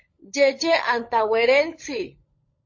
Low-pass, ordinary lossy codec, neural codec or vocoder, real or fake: 7.2 kHz; MP3, 32 kbps; codec, 16 kHz, 16 kbps, FunCodec, trained on LibriTTS, 50 frames a second; fake